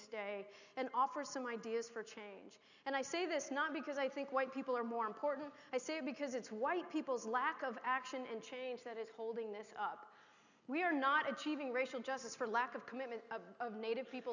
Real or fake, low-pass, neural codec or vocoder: real; 7.2 kHz; none